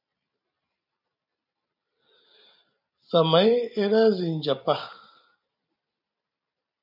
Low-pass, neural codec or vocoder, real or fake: 5.4 kHz; vocoder, 44.1 kHz, 128 mel bands every 256 samples, BigVGAN v2; fake